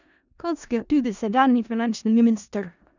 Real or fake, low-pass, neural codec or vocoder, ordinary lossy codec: fake; 7.2 kHz; codec, 16 kHz in and 24 kHz out, 0.4 kbps, LongCat-Audio-Codec, four codebook decoder; none